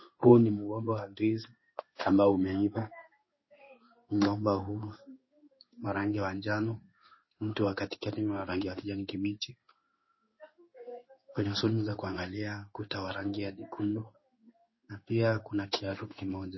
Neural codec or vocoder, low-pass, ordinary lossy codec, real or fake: codec, 16 kHz in and 24 kHz out, 1 kbps, XY-Tokenizer; 7.2 kHz; MP3, 24 kbps; fake